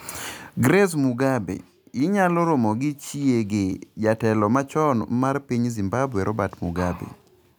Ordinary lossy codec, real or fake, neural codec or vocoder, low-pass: none; real; none; none